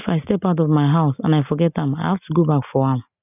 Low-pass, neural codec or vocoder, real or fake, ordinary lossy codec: 3.6 kHz; none; real; none